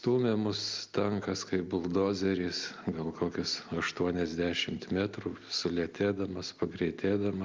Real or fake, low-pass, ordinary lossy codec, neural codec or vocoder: real; 7.2 kHz; Opus, 24 kbps; none